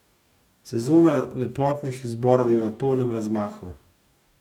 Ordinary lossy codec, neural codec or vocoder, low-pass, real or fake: none; codec, 44.1 kHz, 2.6 kbps, DAC; 19.8 kHz; fake